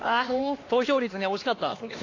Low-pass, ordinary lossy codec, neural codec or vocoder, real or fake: 7.2 kHz; AAC, 32 kbps; codec, 16 kHz, 2 kbps, X-Codec, HuBERT features, trained on LibriSpeech; fake